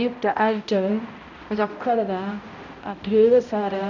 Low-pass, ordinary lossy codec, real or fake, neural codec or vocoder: 7.2 kHz; none; fake; codec, 16 kHz, 0.5 kbps, X-Codec, HuBERT features, trained on balanced general audio